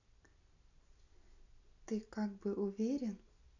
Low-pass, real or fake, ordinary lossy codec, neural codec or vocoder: 7.2 kHz; real; none; none